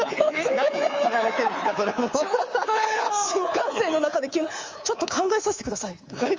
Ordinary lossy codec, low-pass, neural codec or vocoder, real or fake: Opus, 32 kbps; 7.2 kHz; codec, 24 kHz, 3.1 kbps, DualCodec; fake